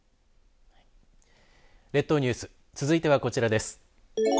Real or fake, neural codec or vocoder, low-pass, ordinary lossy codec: real; none; none; none